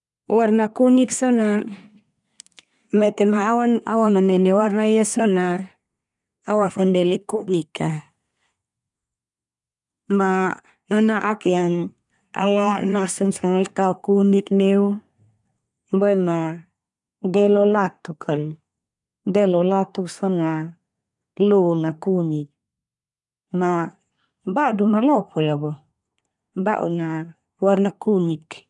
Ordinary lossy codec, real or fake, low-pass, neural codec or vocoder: none; fake; 10.8 kHz; codec, 24 kHz, 1 kbps, SNAC